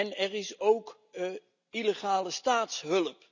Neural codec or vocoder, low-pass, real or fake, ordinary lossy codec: none; 7.2 kHz; real; none